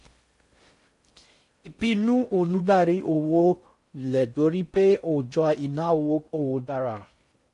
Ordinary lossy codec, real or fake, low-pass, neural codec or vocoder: MP3, 48 kbps; fake; 10.8 kHz; codec, 16 kHz in and 24 kHz out, 0.6 kbps, FocalCodec, streaming, 4096 codes